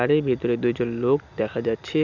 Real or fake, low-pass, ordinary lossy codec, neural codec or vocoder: real; 7.2 kHz; none; none